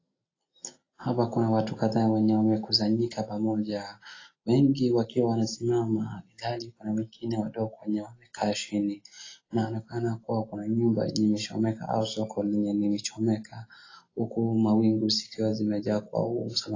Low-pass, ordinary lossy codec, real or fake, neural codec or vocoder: 7.2 kHz; AAC, 32 kbps; real; none